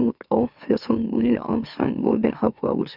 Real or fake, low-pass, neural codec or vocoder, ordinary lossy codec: fake; 5.4 kHz; autoencoder, 44.1 kHz, a latent of 192 numbers a frame, MeloTTS; none